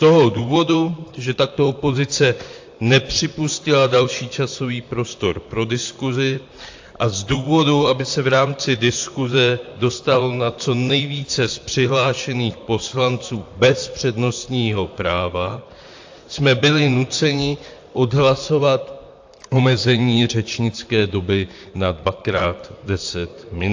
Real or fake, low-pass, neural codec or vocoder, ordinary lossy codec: fake; 7.2 kHz; vocoder, 44.1 kHz, 128 mel bands, Pupu-Vocoder; AAC, 48 kbps